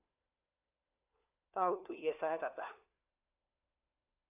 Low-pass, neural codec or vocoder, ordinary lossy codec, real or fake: 3.6 kHz; codec, 16 kHz, 4 kbps, FunCodec, trained on LibriTTS, 50 frames a second; AAC, 32 kbps; fake